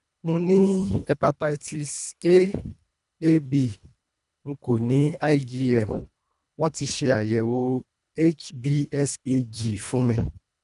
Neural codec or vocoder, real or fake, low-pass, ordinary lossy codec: codec, 24 kHz, 1.5 kbps, HILCodec; fake; 10.8 kHz; none